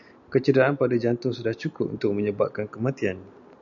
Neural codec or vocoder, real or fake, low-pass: none; real; 7.2 kHz